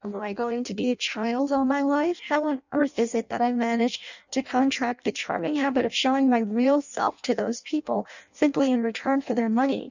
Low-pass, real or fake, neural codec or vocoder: 7.2 kHz; fake; codec, 16 kHz in and 24 kHz out, 0.6 kbps, FireRedTTS-2 codec